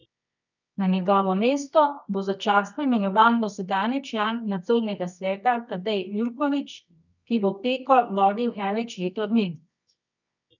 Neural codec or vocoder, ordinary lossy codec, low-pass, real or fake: codec, 24 kHz, 0.9 kbps, WavTokenizer, medium music audio release; none; 7.2 kHz; fake